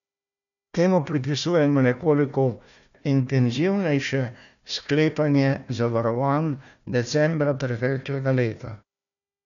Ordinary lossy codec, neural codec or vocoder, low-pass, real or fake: none; codec, 16 kHz, 1 kbps, FunCodec, trained on Chinese and English, 50 frames a second; 7.2 kHz; fake